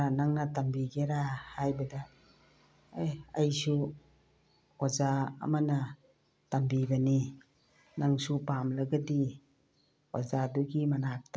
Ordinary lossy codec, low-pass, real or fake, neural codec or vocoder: none; none; real; none